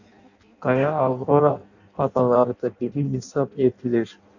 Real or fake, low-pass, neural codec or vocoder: fake; 7.2 kHz; codec, 16 kHz in and 24 kHz out, 0.6 kbps, FireRedTTS-2 codec